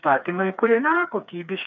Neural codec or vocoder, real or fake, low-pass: codec, 16 kHz, 4 kbps, FreqCodec, smaller model; fake; 7.2 kHz